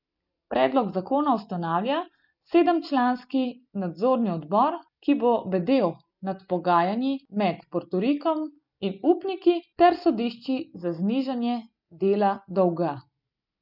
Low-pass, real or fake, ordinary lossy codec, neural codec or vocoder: 5.4 kHz; real; none; none